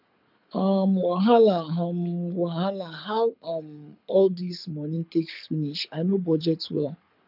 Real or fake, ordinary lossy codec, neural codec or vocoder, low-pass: fake; none; codec, 24 kHz, 6 kbps, HILCodec; 5.4 kHz